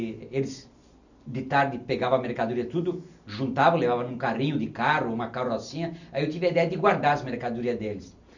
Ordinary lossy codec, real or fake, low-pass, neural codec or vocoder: none; real; 7.2 kHz; none